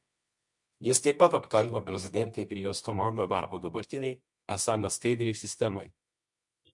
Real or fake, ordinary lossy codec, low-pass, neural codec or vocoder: fake; MP3, 64 kbps; 10.8 kHz; codec, 24 kHz, 0.9 kbps, WavTokenizer, medium music audio release